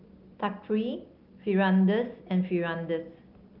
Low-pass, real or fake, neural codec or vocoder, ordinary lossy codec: 5.4 kHz; real; none; Opus, 24 kbps